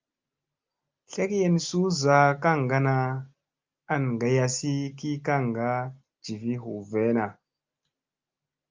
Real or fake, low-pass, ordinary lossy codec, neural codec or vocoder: real; 7.2 kHz; Opus, 32 kbps; none